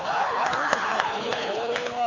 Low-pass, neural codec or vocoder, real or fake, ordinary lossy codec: 7.2 kHz; codec, 24 kHz, 6 kbps, HILCodec; fake; AAC, 32 kbps